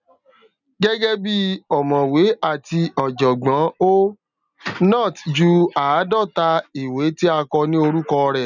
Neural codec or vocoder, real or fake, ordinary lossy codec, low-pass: none; real; none; 7.2 kHz